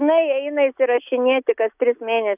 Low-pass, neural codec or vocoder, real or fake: 3.6 kHz; none; real